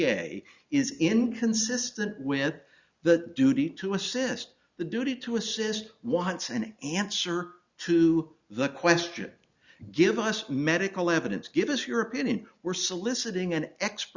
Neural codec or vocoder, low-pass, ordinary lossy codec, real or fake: none; 7.2 kHz; Opus, 64 kbps; real